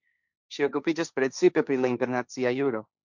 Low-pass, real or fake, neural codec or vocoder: 7.2 kHz; fake; codec, 16 kHz, 1.1 kbps, Voila-Tokenizer